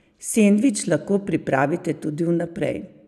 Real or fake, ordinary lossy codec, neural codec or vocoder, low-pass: real; none; none; 14.4 kHz